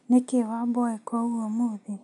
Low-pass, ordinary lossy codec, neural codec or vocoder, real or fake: 10.8 kHz; none; none; real